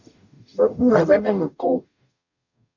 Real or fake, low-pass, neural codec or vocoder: fake; 7.2 kHz; codec, 44.1 kHz, 0.9 kbps, DAC